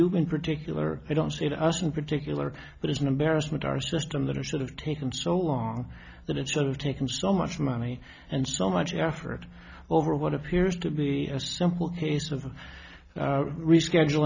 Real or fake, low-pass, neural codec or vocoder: real; 7.2 kHz; none